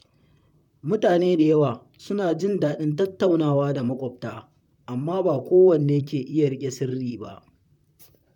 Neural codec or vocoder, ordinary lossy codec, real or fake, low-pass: vocoder, 44.1 kHz, 128 mel bands, Pupu-Vocoder; none; fake; 19.8 kHz